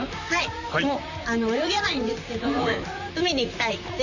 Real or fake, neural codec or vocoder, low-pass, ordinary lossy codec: fake; vocoder, 44.1 kHz, 128 mel bands, Pupu-Vocoder; 7.2 kHz; none